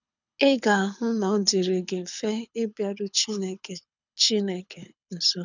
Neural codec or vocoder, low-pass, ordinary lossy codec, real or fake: codec, 24 kHz, 6 kbps, HILCodec; 7.2 kHz; none; fake